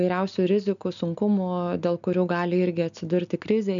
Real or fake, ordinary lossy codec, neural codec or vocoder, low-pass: real; MP3, 96 kbps; none; 7.2 kHz